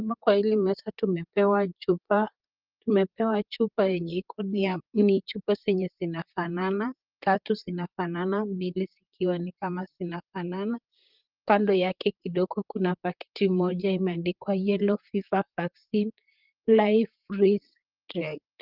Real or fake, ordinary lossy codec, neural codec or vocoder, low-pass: fake; Opus, 32 kbps; vocoder, 44.1 kHz, 128 mel bands, Pupu-Vocoder; 5.4 kHz